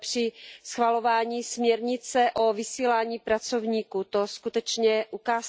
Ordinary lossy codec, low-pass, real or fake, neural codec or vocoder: none; none; real; none